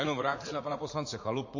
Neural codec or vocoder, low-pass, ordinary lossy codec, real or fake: vocoder, 44.1 kHz, 80 mel bands, Vocos; 7.2 kHz; MP3, 32 kbps; fake